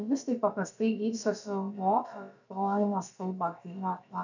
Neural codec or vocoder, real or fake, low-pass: codec, 16 kHz, about 1 kbps, DyCAST, with the encoder's durations; fake; 7.2 kHz